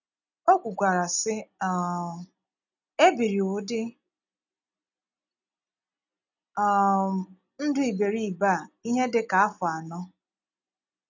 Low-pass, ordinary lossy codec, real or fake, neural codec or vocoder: 7.2 kHz; none; real; none